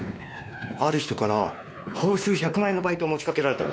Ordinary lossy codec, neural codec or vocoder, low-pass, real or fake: none; codec, 16 kHz, 2 kbps, X-Codec, WavLM features, trained on Multilingual LibriSpeech; none; fake